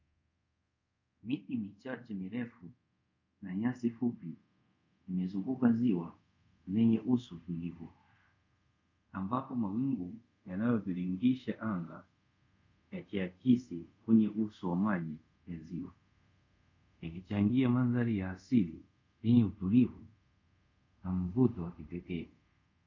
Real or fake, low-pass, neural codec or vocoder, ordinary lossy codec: fake; 7.2 kHz; codec, 24 kHz, 0.5 kbps, DualCodec; MP3, 64 kbps